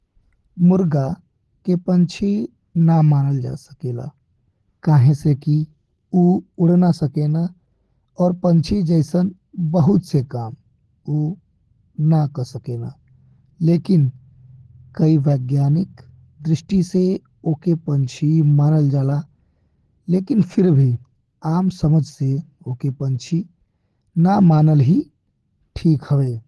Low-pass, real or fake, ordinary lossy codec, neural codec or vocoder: 10.8 kHz; real; Opus, 16 kbps; none